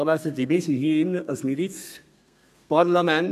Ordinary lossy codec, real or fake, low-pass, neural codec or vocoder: none; fake; 14.4 kHz; codec, 32 kHz, 1.9 kbps, SNAC